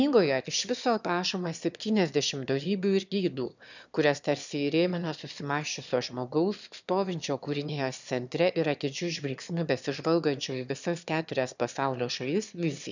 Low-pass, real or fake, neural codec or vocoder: 7.2 kHz; fake; autoencoder, 22.05 kHz, a latent of 192 numbers a frame, VITS, trained on one speaker